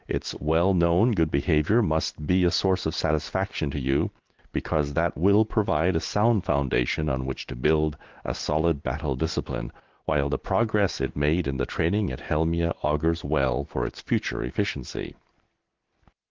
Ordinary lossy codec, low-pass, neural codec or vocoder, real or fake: Opus, 16 kbps; 7.2 kHz; none; real